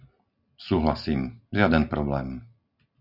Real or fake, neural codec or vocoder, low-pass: real; none; 5.4 kHz